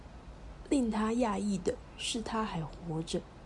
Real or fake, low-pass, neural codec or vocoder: real; 10.8 kHz; none